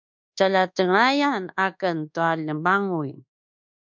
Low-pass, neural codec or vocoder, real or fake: 7.2 kHz; codec, 24 kHz, 1.2 kbps, DualCodec; fake